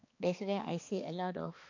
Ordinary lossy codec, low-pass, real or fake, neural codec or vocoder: AAC, 48 kbps; 7.2 kHz; fake; codec, 16 kHz, 2 kbps, X-Codec, HuBERT features, trained on balanced general audio